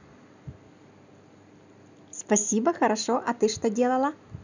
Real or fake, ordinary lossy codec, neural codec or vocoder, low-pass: real; none; none; 7.2 kHz